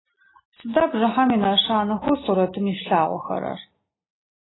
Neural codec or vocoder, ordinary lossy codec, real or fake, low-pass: none; AAC, 16 kbps; real; 7.2 kHz